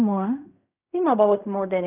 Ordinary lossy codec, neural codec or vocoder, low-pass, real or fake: none; codec, 16 kHz in and 24 kHz out, 0.4 kbps, LongCat-Audio-Codec, fine tuned four codebook decoder; 3.6 kHz; fake